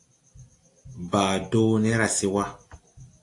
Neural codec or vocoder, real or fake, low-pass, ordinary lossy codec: none; real; 10.8 kHz; AAC, 48 kbps